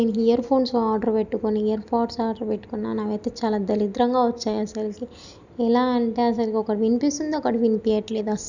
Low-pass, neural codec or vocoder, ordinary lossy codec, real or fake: 7.2 kHz; none; none; real